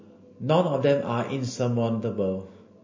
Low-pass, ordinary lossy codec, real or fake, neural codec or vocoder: 7.2 kHz; MP3, 32 kbps; real; none